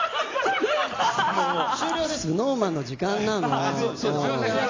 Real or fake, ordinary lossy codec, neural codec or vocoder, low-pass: real; AAC, 32 kbps; none; 7.2 kHz